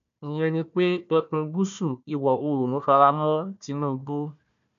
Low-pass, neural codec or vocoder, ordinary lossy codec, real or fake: 7.2 kHz; codec, 16 kHz, 1 kbps, FunCodec, trained on Chinese and English, 50 frames a second; none; fake